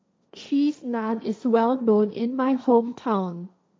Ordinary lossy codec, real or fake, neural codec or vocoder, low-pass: none; fake; codec, 16 kHz, 1.1 kbps, Voila-Tokenizer; none